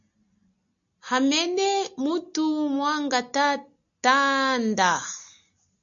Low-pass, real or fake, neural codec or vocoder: 7.2 kHz; real; none